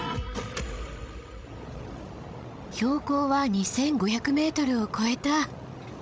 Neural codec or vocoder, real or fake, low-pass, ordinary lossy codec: codec, 16 kHz, 16 kbps, FreqCodec, larger model; fake; none; none